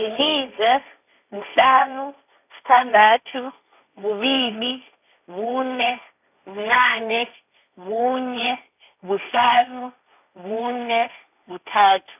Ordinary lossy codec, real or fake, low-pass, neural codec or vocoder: none; fake; 3.6 kHz; codec, 16 kHz, 1.1 kbps, Voila-Tokenizer